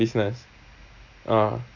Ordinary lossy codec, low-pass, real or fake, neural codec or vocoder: none; 7.2 kHz; real; none